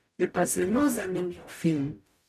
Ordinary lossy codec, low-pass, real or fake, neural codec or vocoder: none; 14.4 kHz; fake; codec, 44.1 kHz, 0.9 kbps, DAC